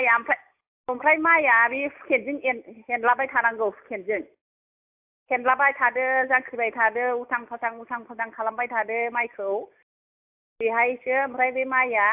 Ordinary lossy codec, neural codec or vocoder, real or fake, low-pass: AAC, 32 kbps; none; real; 3.6 kHz